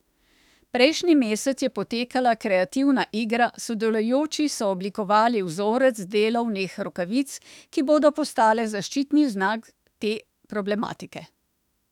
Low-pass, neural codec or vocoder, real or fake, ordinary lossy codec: 19.8 kHz; autoencoder, 48 kHz, 32 numbers a frame, DAC-VAE, trained on Japanese speech; fake; none